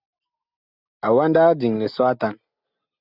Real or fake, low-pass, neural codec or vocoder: real; 5.4 kHz; none